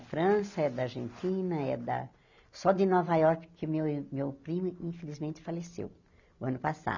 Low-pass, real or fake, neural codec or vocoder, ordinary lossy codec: 7.2 kHz; real; none; none